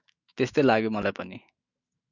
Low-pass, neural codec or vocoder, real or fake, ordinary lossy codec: 7.2 kHz; autoencoder, 48 kHz, 128 numbers a frame, DAC-VAE, trained on Japanese speech; fake; Opus, 64 kbps